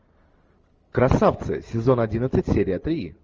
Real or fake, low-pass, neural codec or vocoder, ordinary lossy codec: real; 7.2 kHz; none; Opus, 24 kbps